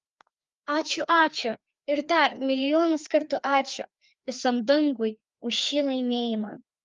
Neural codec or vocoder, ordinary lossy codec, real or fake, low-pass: codec, 16 kHz, 2 kbps, FreqCodec, larger model; Opus, 32 kbps; fake; 7.2 kHz